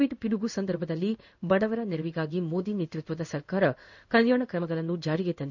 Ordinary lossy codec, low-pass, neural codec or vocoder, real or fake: none; 7.2 kHz; codec, 16 kHz in and 24 kHz out, 1 kbps, XY-Tokenizer; fake